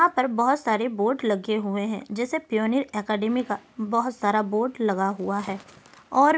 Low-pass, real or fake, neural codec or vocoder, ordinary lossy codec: none; real; none; none